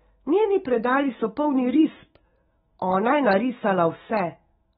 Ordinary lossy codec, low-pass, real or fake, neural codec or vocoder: AAC, 16 kbps; 19.8 kHz; fake; autoencoder, 48 kHz, 128 numbers a frame, DAC-VAE, trained on Japanese speech